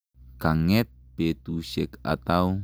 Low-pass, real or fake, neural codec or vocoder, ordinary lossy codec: none; real; none; none